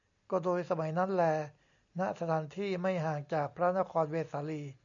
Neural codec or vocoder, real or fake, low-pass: none; real; 7.2 kHz